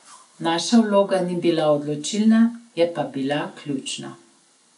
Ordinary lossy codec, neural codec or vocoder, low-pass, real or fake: none; none; 10.8 kHz; real